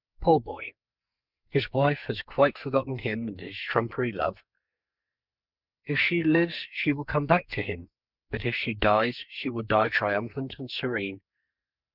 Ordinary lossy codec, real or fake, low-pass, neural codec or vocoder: Opus, 64 kbps; fake; 5.4 kHz; codec, 44.1 kHz, 2.6 kbps, SNAC